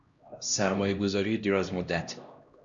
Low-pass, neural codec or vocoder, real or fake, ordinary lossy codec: 7.2 kHz; codec, 16 kHz, 1 kbps, X-Codec, HuBERT features, trained on LibriSpeech; fake; Opus, 64 kbps